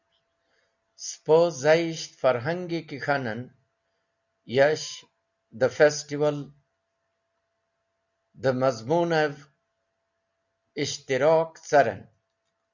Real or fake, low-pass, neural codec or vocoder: real; 7.2 kHz; none